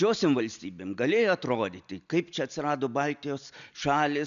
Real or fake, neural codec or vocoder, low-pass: real; none; 7.2 kHz